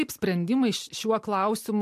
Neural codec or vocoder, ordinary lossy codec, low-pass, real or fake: none; MP3, 64 kbps; 14.4 kHz; real